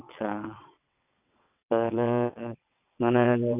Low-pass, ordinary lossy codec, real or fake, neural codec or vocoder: 3.6 kHz; none; fake; vocoder, 22.05 kHz, 80 mel bands, WaveNeXt